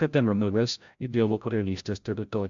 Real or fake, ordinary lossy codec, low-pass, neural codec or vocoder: fake; MP3, 64 kbps; 7.2 kHz; codec, 16 kHz, 0.5 kbps, FreqCodec, larger model